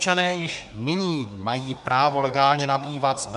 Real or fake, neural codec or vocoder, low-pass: fake; codec, 24 kHz, 1 kbps, SNAC; 10.8 kHz